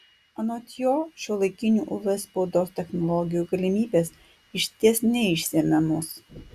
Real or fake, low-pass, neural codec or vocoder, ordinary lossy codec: real; 14.4 kHz; none; Opus, 64 kbps